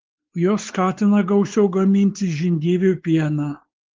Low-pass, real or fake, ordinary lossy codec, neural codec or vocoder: 7.2 kHz; fake; Opus, 16 kbps; codec, 16 kHz, 4 kbps, X-Codec, WavLM features, trained on Multilingual LibriSpeech